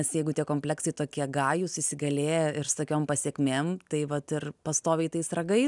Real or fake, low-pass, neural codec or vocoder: real; 10.8 kHz; none